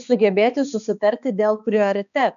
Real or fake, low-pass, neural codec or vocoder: fake; 7.2 kHz; codec, 16 kHz, 2 kbps, X-Codec, HuBERT features, trained on balanced general audio